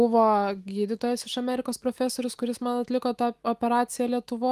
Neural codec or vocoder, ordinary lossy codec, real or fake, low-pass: autoencoder, 48 kHz, 128 numbers a frame, DAC-VAE, trained on Japanese speech; Opus, 24 kbps; fake; 14.4 kHz